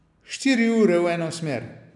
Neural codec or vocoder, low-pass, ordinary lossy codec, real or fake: none; 10.8 kHz; none; real